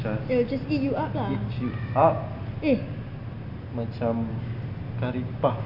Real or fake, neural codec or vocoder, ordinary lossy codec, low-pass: real; none; none; 5.4 kHz